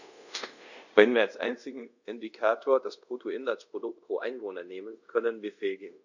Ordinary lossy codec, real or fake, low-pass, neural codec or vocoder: none; fake; 7.2 kHz; codec, 24 kHz, 0.5 kbps, DualCodec